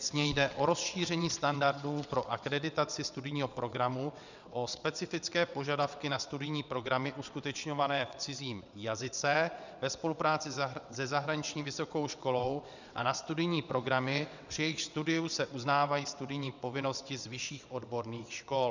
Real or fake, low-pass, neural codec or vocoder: fake; 7.2 kHz; vocoder, 22.05 kHz, 80 mel bands, WaveNeXt